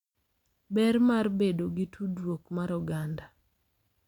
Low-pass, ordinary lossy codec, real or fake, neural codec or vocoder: 19.8 kHz; none; real; none